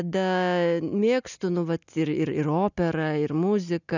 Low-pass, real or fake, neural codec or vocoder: 7.2 kHz; real; none